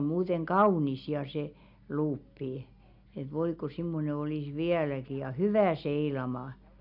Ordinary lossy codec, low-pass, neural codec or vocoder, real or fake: none; 5.4 kHz; none; real